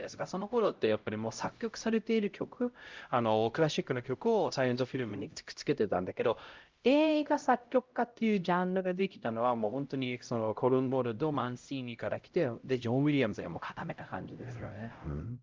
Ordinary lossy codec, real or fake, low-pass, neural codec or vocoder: Opus, 32 kbps; fake; 7.2 kHz; codec, 16 kHz, 0.5 kbps, X-Codec, HuBERT features, trained on LibriSpeech